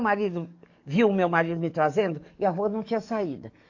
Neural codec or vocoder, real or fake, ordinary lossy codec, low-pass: codec, 44.1 kHz, 7.8 kbps, DAC; fake; AAC, 48 kbps; 7.2 kHz